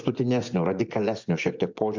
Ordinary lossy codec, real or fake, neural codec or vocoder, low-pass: MP3, 64 kbps; fake; vocoder, 24 kHz, 100 mel bands, Vocos; 7.2 kHz